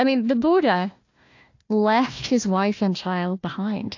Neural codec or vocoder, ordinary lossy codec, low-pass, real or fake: codec, 16 kHz, 1 kbps, FunCodec, trained on Chinese and English, 50 frames a second; AAC, 48 kbps; 7.2 kHz; fake